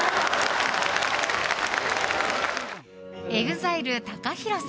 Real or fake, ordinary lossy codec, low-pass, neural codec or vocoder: real; none; none; none